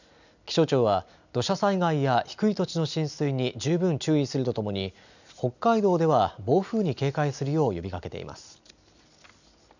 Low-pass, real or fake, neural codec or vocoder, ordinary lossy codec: 7.2 kHz; real; none; none